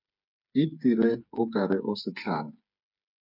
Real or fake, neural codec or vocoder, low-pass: fake; codec, 16 kHz, 8 kbps, FreqCodec, smaller model; 5.4 kHz